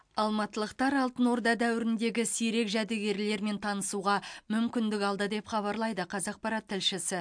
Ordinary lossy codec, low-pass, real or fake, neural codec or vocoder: MP3, 64 kbps; 9.9 kHz; real; none